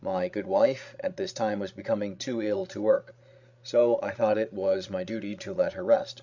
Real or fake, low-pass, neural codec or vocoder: fake; 7.2 kHz; codec, 16 kHz, 16 kbps, FreqCodec, larger model